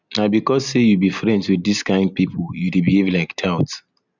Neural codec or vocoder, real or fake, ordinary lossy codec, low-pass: none; real; none; 7.2 kHz